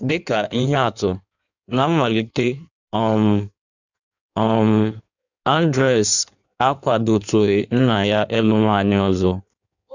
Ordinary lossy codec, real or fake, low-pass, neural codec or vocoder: none; fake; 7.2 kHz; codec, 16 kHz in and 24 kHz out, 1.1 kbps, FireRedTTS-2 codec